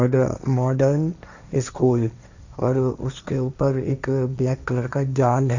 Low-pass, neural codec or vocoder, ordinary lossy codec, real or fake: 7.2 kHz; codec, 16 kHz, 1.1 kbps, Voila-Tokenizer; none; fake